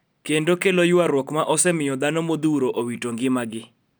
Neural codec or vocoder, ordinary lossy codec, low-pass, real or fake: none; none; none; real